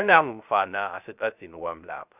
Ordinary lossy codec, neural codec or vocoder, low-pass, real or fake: none; codec, 16 kHz, 0.3 kbps, FocalCodec; 3.6 kHz; fake